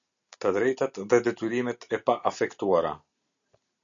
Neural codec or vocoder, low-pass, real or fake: none; 7.2 kHz; real